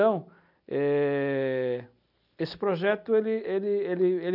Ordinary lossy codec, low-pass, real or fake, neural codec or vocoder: none; 5.4 kHz; real; none